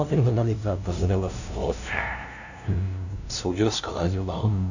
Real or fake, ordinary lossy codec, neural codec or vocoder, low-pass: fake; none; codec, 16 kHz, 0.5 kbps, FunCodec, trained on LibriTTS, 25 frames a second; 7.2 kHz